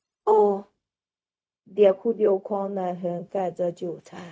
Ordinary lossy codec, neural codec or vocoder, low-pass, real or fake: none; codec, 16 kHz, 0.4 kbps, LongCat-Audio-Codec; none; fake